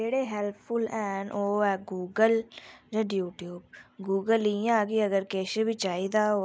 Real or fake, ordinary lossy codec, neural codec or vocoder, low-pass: real; none; none; none